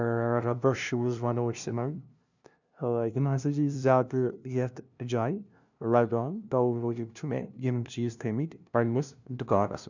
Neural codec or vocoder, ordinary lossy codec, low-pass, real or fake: codec, 16 kHz, 0.5 kbps, FunCodec, trained on LibriTTS, 25 frames a second; none; 7.2 kHz; fake